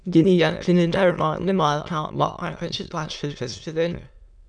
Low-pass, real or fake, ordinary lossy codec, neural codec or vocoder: 9.9 kHz; fake; MP3, 96 kbps; autoencoder, 22.05 kHz, a latent of 192 numbers a frame, VITS, trained on many speakers